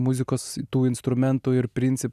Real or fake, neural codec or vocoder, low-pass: real; none; 14.4 kHz